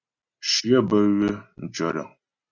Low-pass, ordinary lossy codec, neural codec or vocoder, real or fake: 7.2 kHz; Opus, 64 kbps; none; real